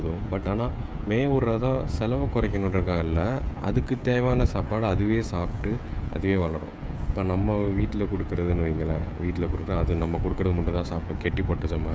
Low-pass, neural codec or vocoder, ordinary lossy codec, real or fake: none; codec, 16 kHz, 16 kbps, FreqCodec, smaller model; none; fake